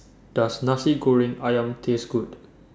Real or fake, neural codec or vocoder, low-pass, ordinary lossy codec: real; none; none; none